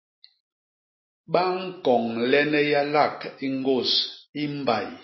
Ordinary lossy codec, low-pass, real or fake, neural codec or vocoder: MP3, 24 kbps; 7.2 kHz; real; none